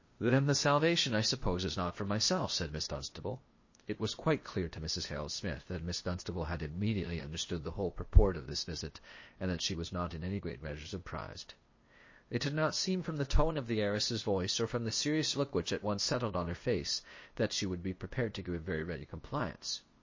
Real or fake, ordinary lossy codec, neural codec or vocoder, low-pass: fake; MP3, 32 kbps; codec, 16 kHz, 0.8 kbps, ZipCodec; 7.2 kHz